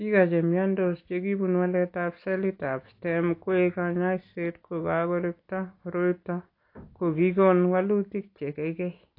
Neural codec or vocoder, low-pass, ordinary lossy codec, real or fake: none; 5.4 kHz; AAC, 32 kbps; real